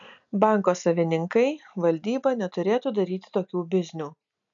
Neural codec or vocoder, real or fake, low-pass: none; real; 7.2 kHz